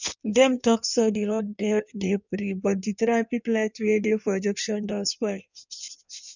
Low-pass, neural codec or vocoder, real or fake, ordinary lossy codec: 7.2 kHz; codec, 16 kHz in and 24 kHz out, 1.1 kbps, FireRedTTS-2 codec; fake; none